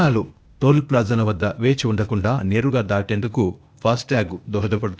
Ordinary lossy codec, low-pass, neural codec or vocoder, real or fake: none; none; codec, 16 kHz, 0.8 kbps, ZipCodec; fake